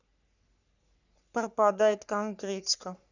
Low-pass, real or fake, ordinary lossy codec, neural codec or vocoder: 7.2 kHz; fake; none; codec, 44.1 kHz, 3.4 kbps, Pupu-Codec